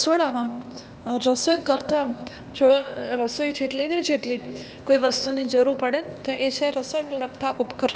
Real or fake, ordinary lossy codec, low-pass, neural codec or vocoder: fake; none; none; codec, 16 kHz, 0.8 kbps, ZipCodec